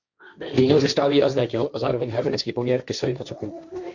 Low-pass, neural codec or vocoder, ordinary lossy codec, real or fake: 7.2 kHz; codec, 16 kHz, 1.1 kbps, Voila-Tokenizer; Opus, 64 kbps; fake